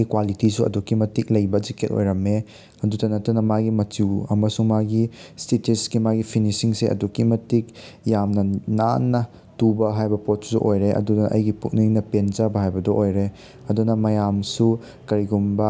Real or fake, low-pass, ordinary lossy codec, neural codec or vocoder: real; none; none; none